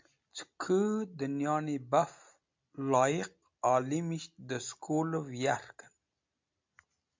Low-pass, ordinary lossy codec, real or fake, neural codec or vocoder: 7.2 kHz; MP3, 64 kbps; real; none